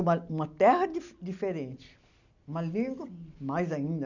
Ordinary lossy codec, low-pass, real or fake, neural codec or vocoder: none; 7.2 kHz; real; none